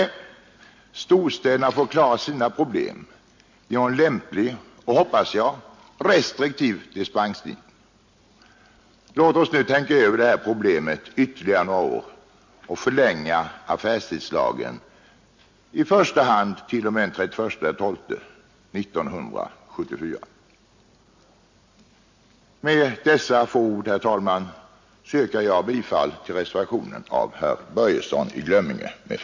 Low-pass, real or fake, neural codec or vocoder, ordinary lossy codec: 7.2 kHz; real; none; MP3, 48 kbps